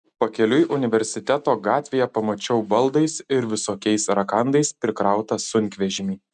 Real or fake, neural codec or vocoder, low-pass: real; none; 10.8 kHz